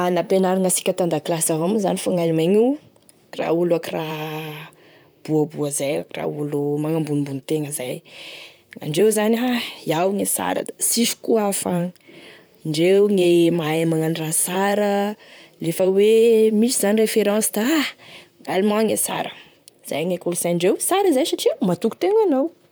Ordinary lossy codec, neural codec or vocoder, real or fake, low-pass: none; vocoder, 44.1 kHz, 128 mel bands, Pupu-Vocoder; fake; none